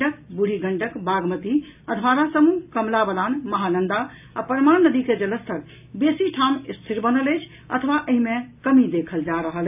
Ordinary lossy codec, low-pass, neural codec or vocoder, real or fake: AAC, 32 kbps; 3.6 kHz; none; real